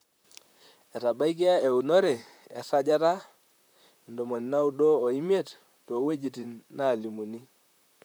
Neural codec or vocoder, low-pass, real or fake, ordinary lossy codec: vocoder, 44.1 kHz, 128 mel bands, Pupu-Vocoder; none; fake; none